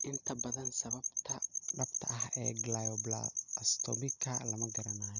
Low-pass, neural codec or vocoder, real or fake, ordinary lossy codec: 7.2 kHz; none; real; none